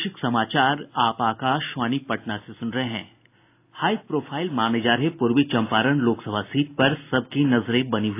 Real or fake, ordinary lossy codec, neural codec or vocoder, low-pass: real; AAC, 24 kbps; none; 3.6 kHz